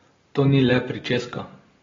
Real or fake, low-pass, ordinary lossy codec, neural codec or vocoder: real; 7.2 kHz; AAC, 24 kbps; none